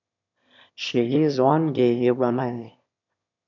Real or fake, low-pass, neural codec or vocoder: fake; 7.2 kHz; autoencoder, 22.05 kHz, a latent of 192 numbers a frame, VITS, trained on one speaker